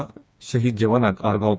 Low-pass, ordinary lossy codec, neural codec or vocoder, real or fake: none; none; codec, 16 kHz, 2 kbps, FreqCodec, smaller model; fake